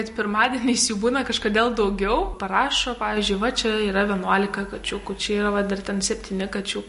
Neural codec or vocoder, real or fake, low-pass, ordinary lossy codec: none; real; 10.8 kHz; MP3, 48 kbps